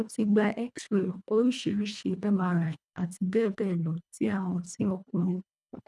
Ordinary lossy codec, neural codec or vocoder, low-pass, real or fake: none; codec, 24 kHz, 1.5 kbps, HILCodec; none; fake